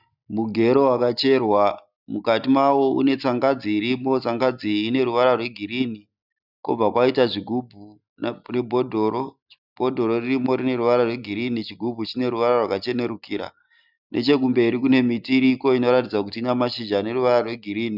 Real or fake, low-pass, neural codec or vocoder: real; 5.4 kHz; none